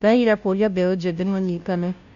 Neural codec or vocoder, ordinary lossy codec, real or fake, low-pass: codec, 16 kHz, 0.5 kbps, FunCodec, trained on Chinese and English, 25 frames a second; none; fake; 7.2 kHz